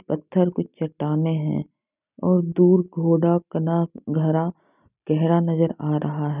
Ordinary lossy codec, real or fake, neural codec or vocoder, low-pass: none; real; none; 3.6 kHz